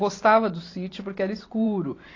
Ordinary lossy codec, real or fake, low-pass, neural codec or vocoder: AAC, 32 kbps; fake; 7.2 kHz; vocoder, 44.1 kHz, 128 mel bands every 512 samples, BigVGAN v2